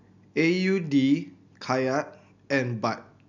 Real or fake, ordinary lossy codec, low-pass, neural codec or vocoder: real; none; 7.2 kHz; none